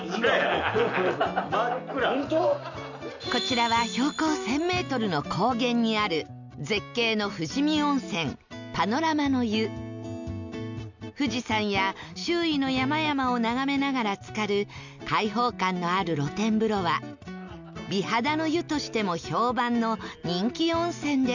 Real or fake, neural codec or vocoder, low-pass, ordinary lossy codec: real; none; 7.2 kHz; none